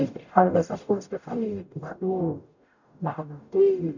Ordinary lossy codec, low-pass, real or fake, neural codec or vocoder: none; 7.2 kHz; fake; codec, 44.1 kHz, 0.9 kbps, DAC